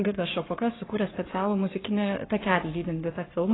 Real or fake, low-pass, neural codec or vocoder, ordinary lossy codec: fake; 7.2 kHz; codec, 44.1 kHz, 7.8 kbps, Pupu-Codec; AAC, 16 kbps